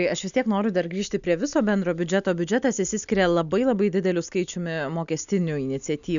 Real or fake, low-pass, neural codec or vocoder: real; 7.2 kHz; none